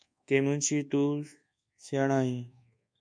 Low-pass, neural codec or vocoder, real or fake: 9.9 kHz; codec, 24 kHz, 1.2 kbps, DualCodec; fake